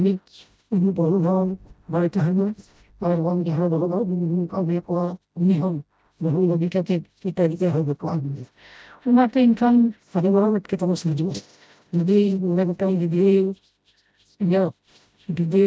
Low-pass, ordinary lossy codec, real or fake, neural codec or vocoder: none; none; fake; codec, 16 kHz, 0.5 kbps, FreqCodec, smaller model